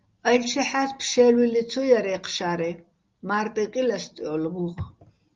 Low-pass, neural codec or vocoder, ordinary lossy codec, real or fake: 7.2 kHz; none; Opus, 32 kbps; real